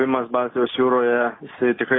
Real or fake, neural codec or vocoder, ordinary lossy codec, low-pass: real; none; AAC, 16 kbps; 7.2 kHz